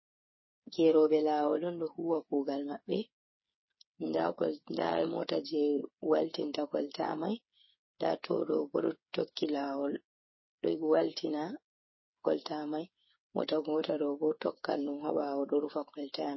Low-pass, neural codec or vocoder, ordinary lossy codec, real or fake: 7.2 kHz; codec, 16 kHz, 8 kbps, FreqCodec, smaller model; MP3, 24 kbps; fake